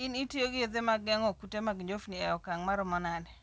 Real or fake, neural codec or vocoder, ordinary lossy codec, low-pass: real; none; none; none